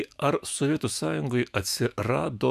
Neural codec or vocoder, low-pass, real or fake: autoencoder, 48 kHz, 128 numbers a frame, DAC-VAE, trained on Japanese speech; 14.4 kHz; fake